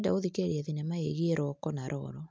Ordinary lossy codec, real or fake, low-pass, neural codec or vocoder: none; real; none; none